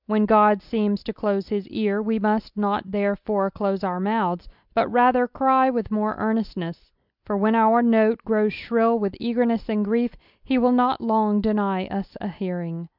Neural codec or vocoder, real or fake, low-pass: none; real; 5.4 kHz